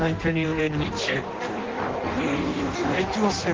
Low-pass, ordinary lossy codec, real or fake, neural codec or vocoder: 7.2 kHz; Opus, 16 kbps; fake; codec, 16 kHz in and 24 kHz out, 0.6 kbps, FireRedTTS-2 codec